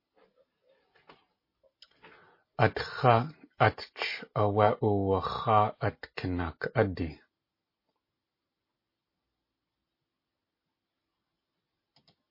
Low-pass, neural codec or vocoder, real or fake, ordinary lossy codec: 5.4 kHz; none; real; MP3, 24 kbps